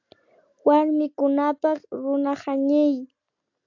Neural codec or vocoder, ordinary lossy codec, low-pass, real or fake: none; AAC, 48 kbps; 7.2 kHz; real